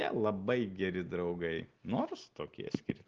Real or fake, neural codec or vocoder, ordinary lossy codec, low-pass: real; none; Opus, 16 kbps; 7.2 kHz